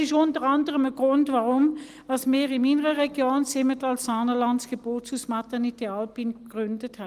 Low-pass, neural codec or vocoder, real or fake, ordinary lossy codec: 14.4 kHz; none; real; Opus, 24 kbps